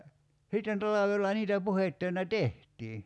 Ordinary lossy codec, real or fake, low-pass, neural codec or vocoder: none; real; none; none